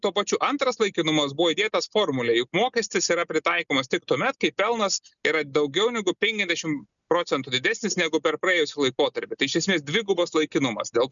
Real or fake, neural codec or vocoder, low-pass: real; none; 7.2 kHz